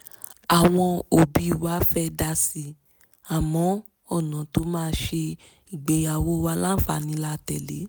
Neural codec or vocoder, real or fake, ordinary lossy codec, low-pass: none; real; none; none